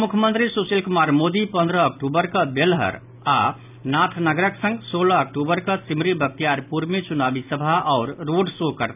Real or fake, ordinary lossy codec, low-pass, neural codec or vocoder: real; none; 3.6 kHz; none